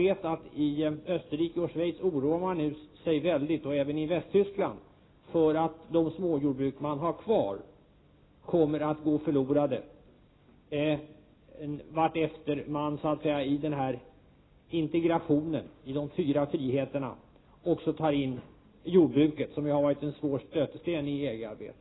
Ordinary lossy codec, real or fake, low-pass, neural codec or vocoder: AAC, 16 kbps; real; 7.2 kHz; none